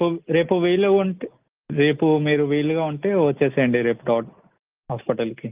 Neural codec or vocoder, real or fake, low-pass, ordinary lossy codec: none; real; 3.6 kHz; Opus, 24 kbps